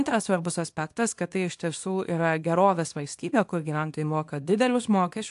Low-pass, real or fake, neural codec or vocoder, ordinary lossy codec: 10.8 kHz; fake; codec, 24 kHz, 0.9 kbps, WavTokenizer, small release; AAC, 64 kbps